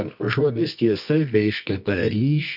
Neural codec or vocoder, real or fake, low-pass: codec, 24 kHz, 0.9 kbps, WavTokenizer, medium music audio release; fake; 5.4 kHz